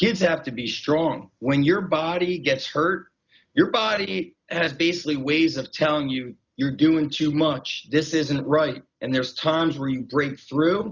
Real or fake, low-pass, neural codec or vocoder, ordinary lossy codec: real; 7.2 kHz; none; Opus, 64 kbps